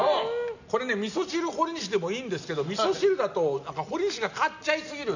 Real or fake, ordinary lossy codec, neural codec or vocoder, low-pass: real; none; none; 7.2 kHz